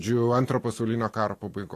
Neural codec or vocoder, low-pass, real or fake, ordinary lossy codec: none; 14.4 kHz; real; AAC, 48 kbps